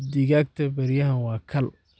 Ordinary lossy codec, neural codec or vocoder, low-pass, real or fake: none; none; none; real